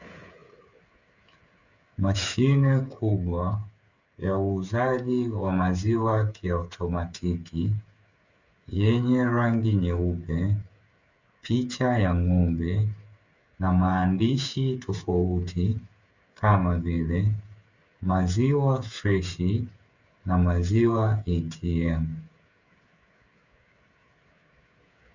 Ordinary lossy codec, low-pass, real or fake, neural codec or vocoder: Opus, 64 kbps; 7.2 kHz; fake; codec, 16 kHz, 8 kbps, FreqCodec, smaller model